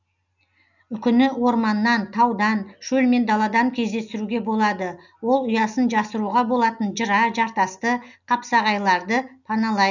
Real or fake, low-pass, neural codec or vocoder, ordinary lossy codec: real; 7.2 kHz; none; none